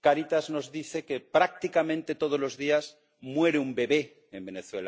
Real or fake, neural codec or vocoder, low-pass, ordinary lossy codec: real; none; none; none